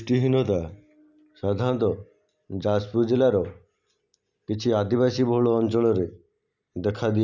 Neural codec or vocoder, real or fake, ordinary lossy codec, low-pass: none; real; none; 7.2 kHz